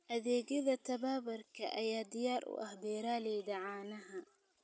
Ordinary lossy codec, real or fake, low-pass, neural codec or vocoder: none; real; none; none